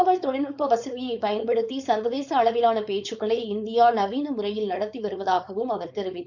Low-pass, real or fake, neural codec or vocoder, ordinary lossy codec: 7.2 kHz; fake; codec, 16 kHz, 4.8 kbps, FACodec; none